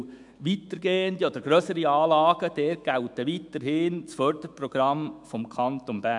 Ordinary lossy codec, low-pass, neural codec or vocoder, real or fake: MP3, 96 kbps; 10.8 kHz; autoencoder, 48 kHz, 128 numbers a frame, DAC-VAE, trained on Japanese speech; fake